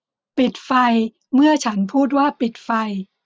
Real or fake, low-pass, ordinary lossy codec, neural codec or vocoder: real; none; none; none